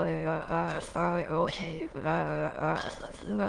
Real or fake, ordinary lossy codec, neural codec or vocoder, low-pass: fake; Opus, 24 kbps; autoencoder, 22.05 kHz, a latent of 192 numbers a frame, VITS, trained on many speakers; 9.9 kHz